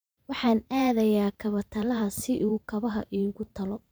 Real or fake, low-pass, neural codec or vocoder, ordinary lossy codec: fake; none; vocoder, 44.1 kHz, 128 mel bands every 512 samples, BigVGAN v2; none